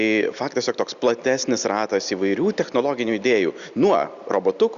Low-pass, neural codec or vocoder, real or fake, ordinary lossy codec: 7.2 kHz; none; real; Opus, 64 kbps